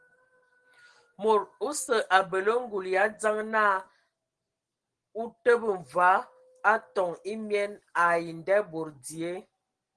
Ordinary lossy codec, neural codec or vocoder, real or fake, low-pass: Opus, 16 kbps; none; real; 9.9 kHz